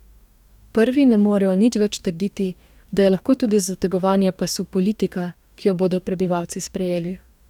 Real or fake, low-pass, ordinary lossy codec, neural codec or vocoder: fake; 19.8 kHz; none; codec, 44.1 kHz, 2.6 kbps, DAC